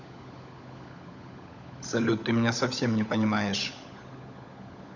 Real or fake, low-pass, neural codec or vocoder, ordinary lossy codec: fake; 7.2 kHz; codec, 16 kHz, 16 kbps, FunCodec, trained on LibriTTS, 50 frames a second; none